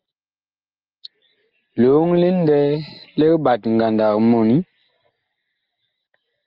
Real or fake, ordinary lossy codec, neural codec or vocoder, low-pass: real; Opus, 32 kbps; none; 5.4 kHz